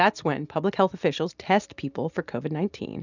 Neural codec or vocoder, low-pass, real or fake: none; 7.2 kHz; real